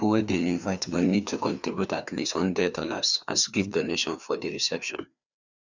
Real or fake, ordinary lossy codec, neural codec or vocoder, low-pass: fake; none; codec, 16 kHz, 2 kbps, FreqCodec, larger model; 7.2 kHz